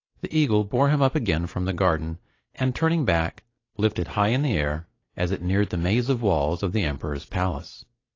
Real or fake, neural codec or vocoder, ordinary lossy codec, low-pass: real; none; AAC, 32 kbps; 7.2 kHz